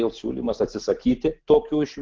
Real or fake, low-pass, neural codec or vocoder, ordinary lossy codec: real; 7.2 kHz; none; Opus, 16 kbps